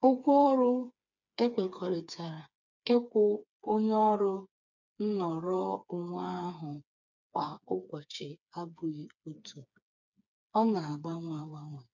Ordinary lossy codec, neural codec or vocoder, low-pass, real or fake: none; codec, 16 kHz, 4 kbps, FreqCodec, smaller model; 7.2 kHz; fake